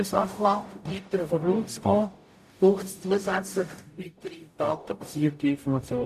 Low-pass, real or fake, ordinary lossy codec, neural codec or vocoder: 14.4 kHz; fake; none; codec, 44.1 kHz, 0.9 kbps, DAC